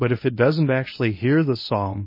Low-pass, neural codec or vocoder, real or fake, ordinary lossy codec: 5.4 kHz; codec, 24 kHz, 0.9 kbps, WavTokenizer, medium speech release version 1; fake; MP3, 24 kbps